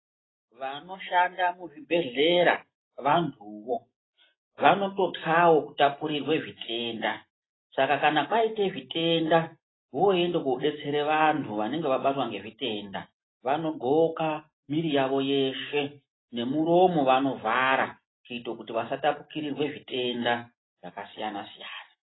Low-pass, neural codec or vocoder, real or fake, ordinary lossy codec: 7.2 kHz; none; real; AAC, 16 kbps